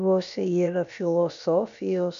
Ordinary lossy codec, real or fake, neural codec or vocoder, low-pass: MP3, 64 kbps; fake; codec, 16 kHz, about 1 kbps, DyCAST, with the encoder's durations; 7.2 kHz